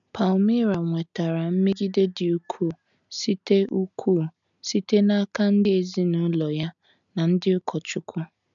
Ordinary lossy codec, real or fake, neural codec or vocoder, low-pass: none; real; none; 7.2 kHz